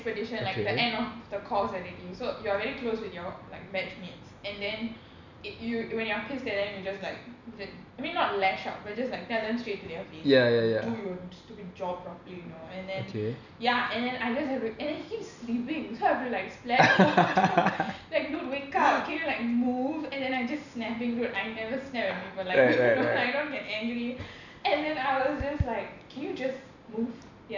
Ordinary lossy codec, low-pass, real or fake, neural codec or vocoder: none; 7.2 kHz; real; none